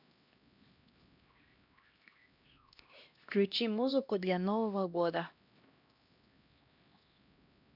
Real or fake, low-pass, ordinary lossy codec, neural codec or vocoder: fake; 5.4 kHz; none; codec, 16 kHz, 1 kbps, X-Codec, HuBERT features, trained on LibriSpeech